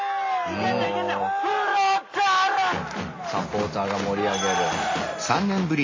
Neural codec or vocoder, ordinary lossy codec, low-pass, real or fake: none; MP3, 32 kbps; 7.2 kHz; real